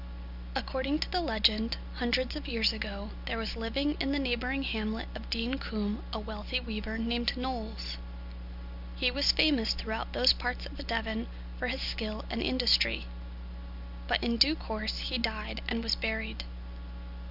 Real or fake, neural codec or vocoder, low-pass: real; none; 5.4 kHz